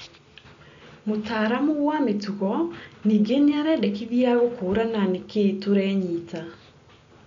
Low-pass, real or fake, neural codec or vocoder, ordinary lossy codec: 7.2 kHz; real; none; MP3, 64 kbps